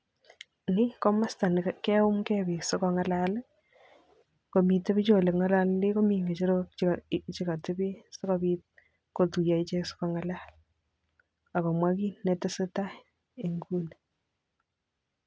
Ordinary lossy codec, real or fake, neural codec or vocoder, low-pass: none; real; none; none